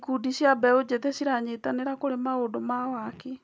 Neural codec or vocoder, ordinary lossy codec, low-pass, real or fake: none; none; none; real